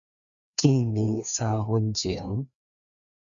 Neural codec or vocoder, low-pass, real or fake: codec, 16 kHz, 2 kbps, FreqCodec, larger model; 7.2 kHz; fake